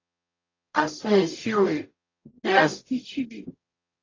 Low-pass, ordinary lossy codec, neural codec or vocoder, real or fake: 7.2 kHz; AAC, 32 kbps; codec, 44.1 kHz, 0.9 kbps, DAC; fake